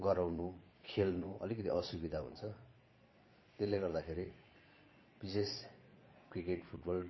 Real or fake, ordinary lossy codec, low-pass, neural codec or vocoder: fake; MP3, 24 kbps; 7.2 kHz; vocoder, 22.05 kHz, 80 mel bands, Vocos